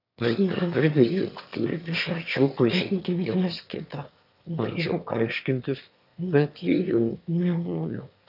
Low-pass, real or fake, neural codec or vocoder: 5.4 kHz; fake; autoencoder, 22.05 kHz, a latent of 192 numbers a frame, VITS, trained on one speaker